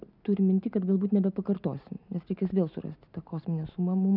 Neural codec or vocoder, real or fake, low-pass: none; real; 5.4 kHz